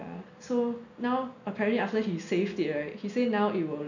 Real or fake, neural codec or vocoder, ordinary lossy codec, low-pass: real; none; none; 7.2 kHz